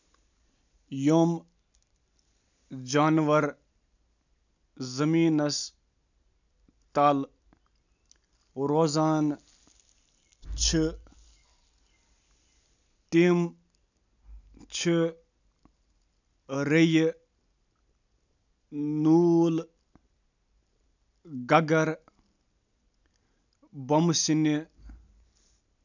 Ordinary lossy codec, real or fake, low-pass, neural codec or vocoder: none; real; 7.2 kHz; none